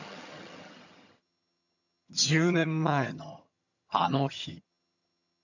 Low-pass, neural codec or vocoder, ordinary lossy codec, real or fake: 7.2 kHz; vocoder, 22.05 kHz, 80 mel bands, HiFi-GAN; none; fake